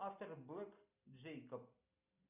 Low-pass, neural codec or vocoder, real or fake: 3.6 kHz; none; real